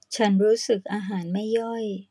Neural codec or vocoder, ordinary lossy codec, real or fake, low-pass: none; none; real; none